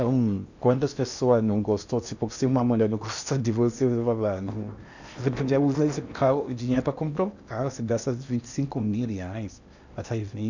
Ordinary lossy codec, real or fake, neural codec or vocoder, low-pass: none; fake; codec, 16 kHz in and 24 kHz out, 0.8 kbps, FocalCodec, streaming, 65536 codes; 7.2 kHz